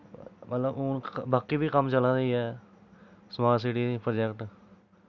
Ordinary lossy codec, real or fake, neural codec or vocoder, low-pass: none; real; none; 7.2 kHz